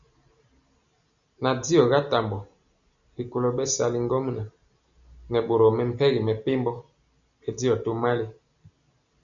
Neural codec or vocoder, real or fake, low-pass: none; real; 7.2 kHz